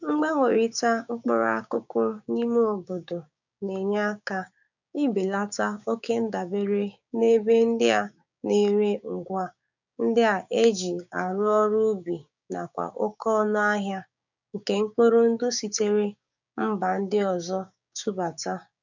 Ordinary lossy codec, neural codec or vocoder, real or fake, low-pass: none; codec, 16 kHz, 6 kbps, DAC; fake; 7.2 kHz